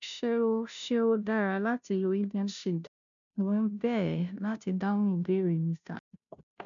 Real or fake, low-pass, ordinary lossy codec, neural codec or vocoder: fake; 7.2 kHz; none; codec, 16 kHz, 0.5 kbps, FunCodec, trained on Chinese and English, 25 frames a second